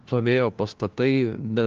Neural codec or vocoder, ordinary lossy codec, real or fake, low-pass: codec, 16 kHz, 1 kbps, FunCodec, trained on LibriTTS, 50 frames a second; Opus, 24 kbps; fake; 7.2 kHz